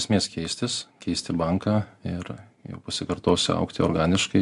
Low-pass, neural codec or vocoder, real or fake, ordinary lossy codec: 10.8 kHz; none; real; MP3, 64 kbps